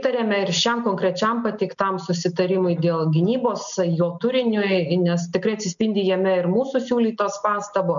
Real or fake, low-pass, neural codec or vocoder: real; 7.2 kHz; none